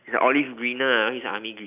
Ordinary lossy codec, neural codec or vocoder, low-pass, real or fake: none; none; 3.6 kHz; real